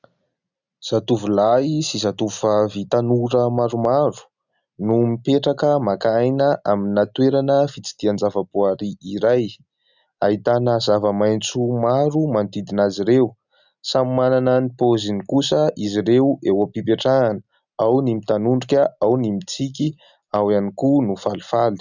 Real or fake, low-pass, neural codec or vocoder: real; 7.2 kHz; none